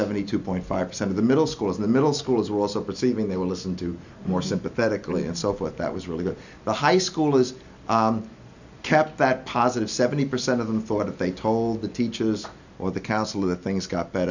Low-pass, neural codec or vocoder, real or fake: 7.2 kHz; none; real